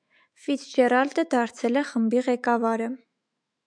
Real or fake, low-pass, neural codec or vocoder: fake; 9.9 kHz; autoencoder, 48 kHz, 128 numbers a frame, DAC-VAE, trained on Japanese speech